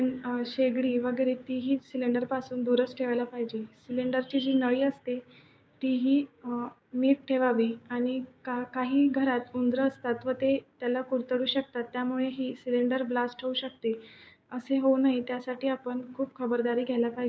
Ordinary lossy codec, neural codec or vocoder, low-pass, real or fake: none; codec, 16 kHz, 6 kbps, DAC; none; fake